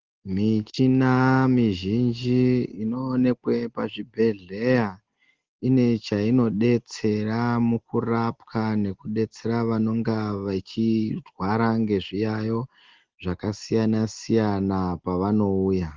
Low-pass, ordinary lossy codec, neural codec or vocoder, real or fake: 7.2 kHz; Opus, 16 kbps; none; real